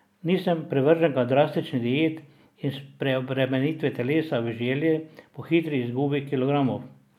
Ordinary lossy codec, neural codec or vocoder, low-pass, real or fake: none; none; 19.8 kHz; real